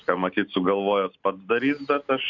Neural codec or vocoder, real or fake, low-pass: none; real; 7.2 kHz